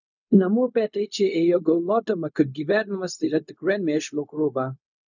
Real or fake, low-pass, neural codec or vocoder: fake; 7.2 kHz; codec, 16 kHz, 0.4 kbps, LongCat-Audio-Codec